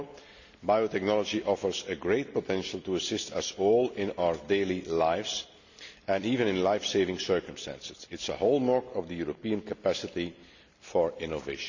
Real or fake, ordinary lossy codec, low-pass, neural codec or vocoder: real; none; 7.2 kHz; none